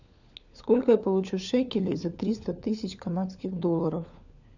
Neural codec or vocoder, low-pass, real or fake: codec, 16 kHz, 4 kbps, FunCodec, trained on LibriTTS, 50 frames a second; 7.2 kHz; fake